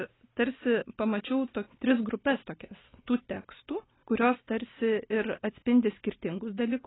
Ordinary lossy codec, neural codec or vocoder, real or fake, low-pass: AAC, 16 kbps; none; real; 7.2 kHz